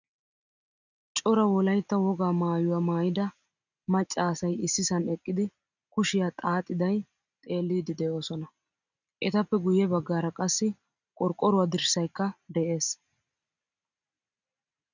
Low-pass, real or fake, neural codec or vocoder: 7.2 kHz; real; none